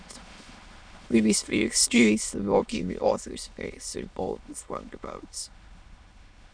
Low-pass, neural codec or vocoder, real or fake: 9.9 kHz; autoencoder, 22.05 kHz, a latent of 192 numbers a frame, VITS, trained on many speakers; fake